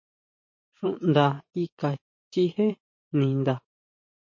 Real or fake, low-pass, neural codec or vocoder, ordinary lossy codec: fake; 7.2 kHz; vocoder, 44.1 kHz, 128 mel bands every 512 samples, BigVGAN v2; MP3, 32 kbps